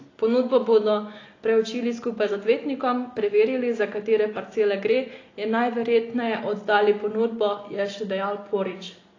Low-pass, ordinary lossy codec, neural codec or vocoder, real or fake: 7.2 kHz; AAC, 32 kbps; none; real